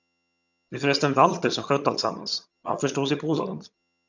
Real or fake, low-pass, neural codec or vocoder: fake; 7.2 kHz; vocoder, 22.05 kHz, 80 mel bands, HiFi-GAN